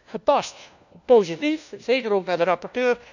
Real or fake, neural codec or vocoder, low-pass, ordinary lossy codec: fake; codec, 16 kHz, 1 kbps, FunCodec, trained on LibriTTS, 50 frames a second; 7.2 kHz; none